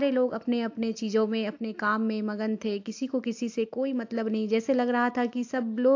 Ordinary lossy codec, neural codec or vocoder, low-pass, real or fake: AAC, 48 kbps; none; 7.2 kHz; real